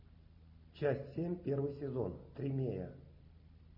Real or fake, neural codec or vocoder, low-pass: real; none; 5.4 kHz